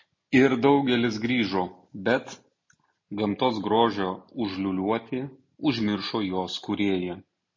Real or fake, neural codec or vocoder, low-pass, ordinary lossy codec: real; none; 7.2 kHz; MP3, 32 kbps